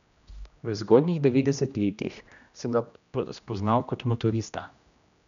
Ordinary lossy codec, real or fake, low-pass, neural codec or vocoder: MP3, 96 kbps; fake; 7.2 kHz; codec, 16 kHz, 1 kbps, X-Codec, HuBERT features, trained on general audio